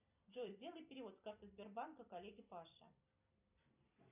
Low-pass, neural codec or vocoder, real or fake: 3.6 kHz; vocoder, 44.1 kHz, 128 mel bands every 256 samples, BigVGAN v2; fake